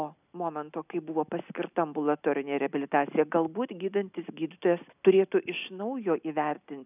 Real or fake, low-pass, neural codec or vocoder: real; 3.6 kHz; none